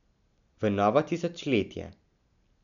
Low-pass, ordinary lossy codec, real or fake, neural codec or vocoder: 7.2 kHz; none; real; none